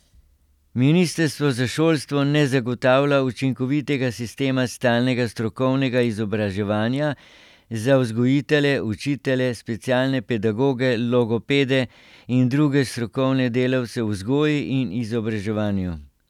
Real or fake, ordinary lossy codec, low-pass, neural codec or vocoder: real; none; 19.8 kHz; none